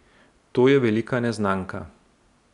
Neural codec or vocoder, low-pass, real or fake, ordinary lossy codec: none; 10.8 kHz; real; none